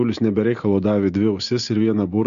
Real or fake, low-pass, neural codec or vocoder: real; 7.2 kHz; none